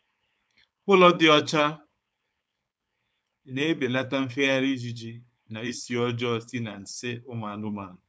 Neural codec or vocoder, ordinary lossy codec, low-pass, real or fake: codec, 16 kHz, 4.8 kbps, FACodec; none; none; fake